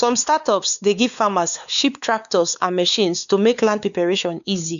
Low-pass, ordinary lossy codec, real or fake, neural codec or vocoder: 7.2 kHz; none; fake; codec, 16 kHz, 4 kbps, X-Codec, WavLM features, trained on Multilingual LibriSpeech